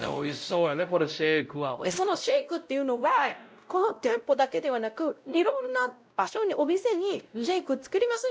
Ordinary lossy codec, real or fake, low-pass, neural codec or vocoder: none; fake; none; codec, 16 kHz, 0.5 kbps, X-Codec, WavLM features, trained on Multilingual LibriSpeech